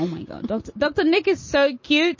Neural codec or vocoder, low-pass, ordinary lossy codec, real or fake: none; 7.2 kHz; MP3, 32 kbps; real